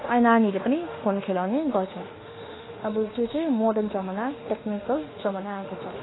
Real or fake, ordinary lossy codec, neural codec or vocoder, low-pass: fake; AAC, 16 kbps; autoencoder, 48 kHz, 32 numbers a frame, DAC-VAE, trained on Japanese speech; 7.2 kHz